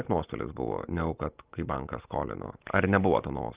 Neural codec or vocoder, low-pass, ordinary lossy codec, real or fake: none; 3.6 kHz; Opus, 32 kbps; real